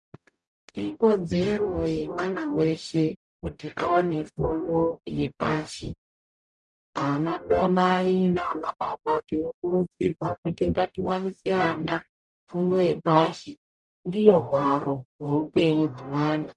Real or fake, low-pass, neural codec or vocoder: fake; 10.8 kHz; codec, 44.1 kHz, 0.9 kbps, DAC